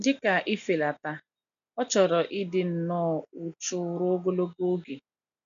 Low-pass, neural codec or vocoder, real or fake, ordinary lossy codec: 7.2 kHz; none; real; none